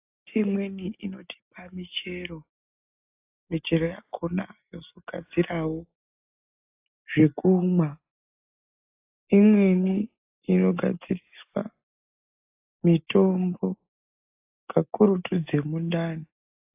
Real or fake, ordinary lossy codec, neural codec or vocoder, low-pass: real; AAC, 32 kbps; none; 3.6 kHz